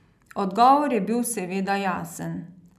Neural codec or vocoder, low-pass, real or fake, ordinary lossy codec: none; 14.4 kHz; real; none